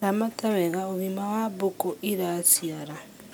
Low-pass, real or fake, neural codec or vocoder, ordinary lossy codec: none; real; none; none